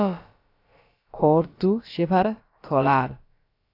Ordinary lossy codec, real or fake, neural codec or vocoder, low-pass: AAC, 32 kbps; fake; codec, 16 kHz, about 1 kbps, DyCAST, with the encoder's durations; 5.4 kHz